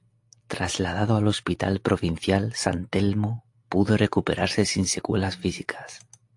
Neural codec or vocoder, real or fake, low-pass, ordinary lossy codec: none; real; 10.8 kHz; AAC, 64 kbps